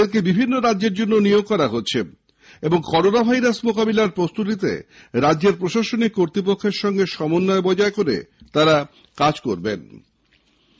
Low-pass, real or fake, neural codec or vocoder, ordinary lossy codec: none; real; none; none